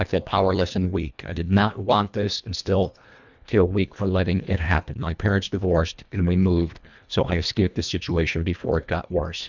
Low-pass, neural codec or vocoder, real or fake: 7.2 kHz; codec, 24 kHz, 1.5 kbps, HILCodec; fake